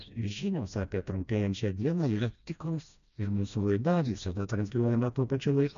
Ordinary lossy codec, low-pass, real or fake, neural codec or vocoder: MP3, 96 kbps; 7.2 kHz; fake; codec, 16 kHz, 1 kbps, FreqCodec, smaller model